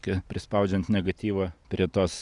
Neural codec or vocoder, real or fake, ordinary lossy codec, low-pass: none; real; Opus, 64 kbps; 10.8 kHz